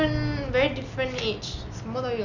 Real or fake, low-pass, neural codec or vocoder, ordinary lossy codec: real; 7.2 kHz; none; none